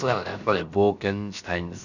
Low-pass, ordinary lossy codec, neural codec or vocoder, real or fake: 7.2 kHz; none; codec, 16 kHz, about 1 kbps, DyCAST, with the encoder's durations; fake